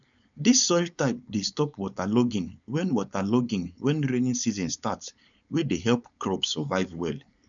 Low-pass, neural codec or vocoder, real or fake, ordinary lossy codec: 7.2 kHz; codec, 16 kHz, 4.8 kbps, FACodec; fake; none